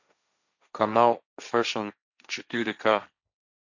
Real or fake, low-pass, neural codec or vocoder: fake; 7.2 kHz; codec, 16 kHz, 1.1 kbps, Voila-Tokenizer